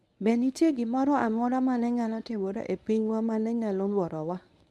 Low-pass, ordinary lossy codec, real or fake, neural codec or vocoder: none; none; fake; codec, 24 kHz, 0.9 kbps, WavTokenizer, medium speech release version 1